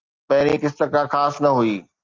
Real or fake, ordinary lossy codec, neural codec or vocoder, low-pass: real; Opus, 24 kbps; none; 7.2 kHz